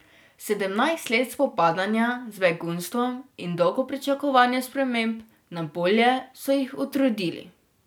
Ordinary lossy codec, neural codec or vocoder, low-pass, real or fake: none; none; none; real